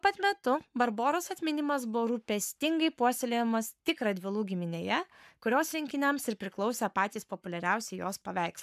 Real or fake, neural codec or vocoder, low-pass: fake; codec, 44.1 kHz, 7.8 kbps, Pupu-Codec; 14.4 kHz